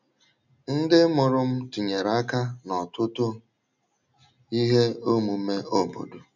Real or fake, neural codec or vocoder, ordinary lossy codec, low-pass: real; none; none; 7.2 kHz